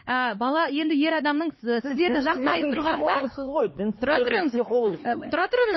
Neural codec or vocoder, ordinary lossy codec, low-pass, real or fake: codec, 16 kHz, 4 kbps, X-Codec, HuBERT features, trained on LibriSpeech; MP3, 24 kbps; 7.2 kHz; fake